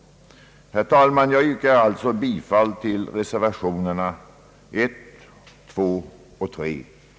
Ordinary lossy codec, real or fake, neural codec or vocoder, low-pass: none; real; none; none